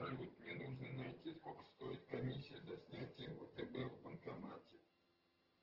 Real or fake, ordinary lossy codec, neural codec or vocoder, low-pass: fake; Opus, 16 kbps; vocoder, 22.05 kHz, 80 mel bands, HiFi-GAN; 5.4 kHz